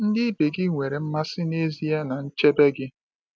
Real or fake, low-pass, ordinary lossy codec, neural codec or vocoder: real; none; none; none